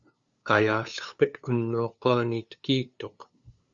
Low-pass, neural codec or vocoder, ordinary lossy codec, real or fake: 7.2 kHz; codec, 16 kHz, 2 kbps, FunCodec, trained on LibriTTS, 25 frames a second; Opus, 64 kbps; fake